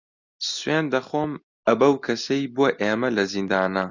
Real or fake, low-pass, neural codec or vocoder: real; 7.2 kHz; none